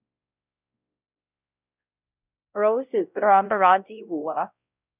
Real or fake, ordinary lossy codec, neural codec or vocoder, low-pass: fake; none; codec, 16 kHz, 0.5 kbps, X-Codec, WavLM features, trained on Multilingual LibriSpeech; 3.6 kHz